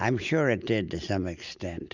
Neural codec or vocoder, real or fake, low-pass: none; real; 7.2 kHz